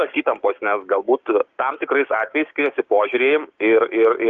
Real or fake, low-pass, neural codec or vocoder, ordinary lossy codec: fake; 7.2 kHz; codec, 16 kHz, 6 kbps, DAC; Opus, 24 kbps